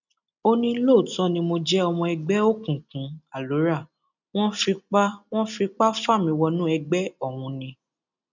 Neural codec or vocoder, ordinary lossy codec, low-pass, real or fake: none; none; 7.2 kHz; real